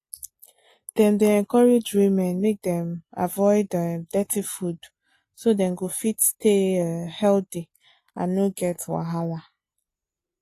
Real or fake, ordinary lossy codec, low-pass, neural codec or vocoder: real; AAC, 48 kbps; 14.4 kHz; none